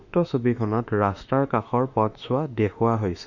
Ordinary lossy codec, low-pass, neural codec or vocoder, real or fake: none; 7.2 kHz; autoencoder, 48 kHz, 32 numbers a frame, DAC-VAE, trained on Japanese speech; fake